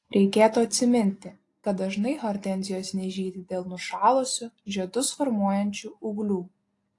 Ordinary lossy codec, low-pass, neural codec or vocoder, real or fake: AAC, 48 kbps; 10.8 kHz; none; real